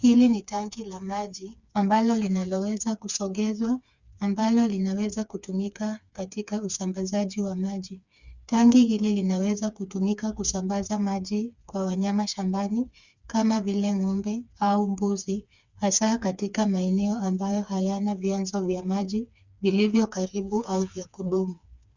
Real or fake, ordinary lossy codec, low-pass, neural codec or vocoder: fake; Opus, 64 kbps; 7.2 kHz; codec, 16 kHz, 4 kbps, FreqCodec, smaller model